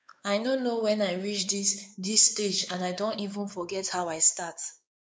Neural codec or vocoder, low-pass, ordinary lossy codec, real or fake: codec, 16 kHz, 4 kbps, X-Codec, WavLM features, trained on Multilingual LibriSpeech; none; none; fake